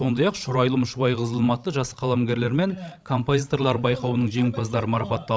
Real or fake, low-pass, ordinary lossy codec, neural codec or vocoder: fake; none; none; codec, 16 kHz, 16 kbps, FreqCodec, larger model